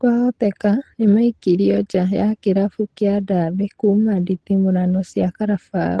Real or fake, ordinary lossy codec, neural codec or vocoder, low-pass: real; Opus, 16 kbps; none; 10.8 kHz